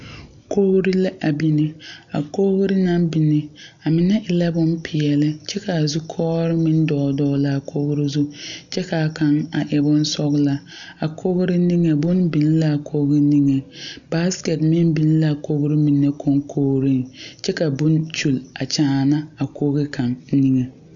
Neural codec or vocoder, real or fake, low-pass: none; real; 7.2 kHz